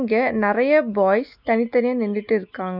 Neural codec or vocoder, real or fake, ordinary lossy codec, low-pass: none; real; none; 5.4 kHz